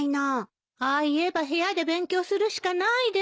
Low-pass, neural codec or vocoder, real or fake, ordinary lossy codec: none; none; real; none